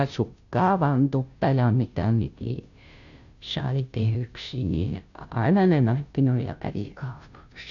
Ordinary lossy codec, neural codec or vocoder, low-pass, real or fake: none; codec, 16 kHz, 0.5 kbps, FunCodec, trained on Chinese and English, 25 frames a second; 7.2 kHz; fake